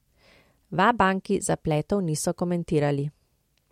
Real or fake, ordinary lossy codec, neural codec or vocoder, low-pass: real; MP3, 64 kbps; none; 19.8 kHz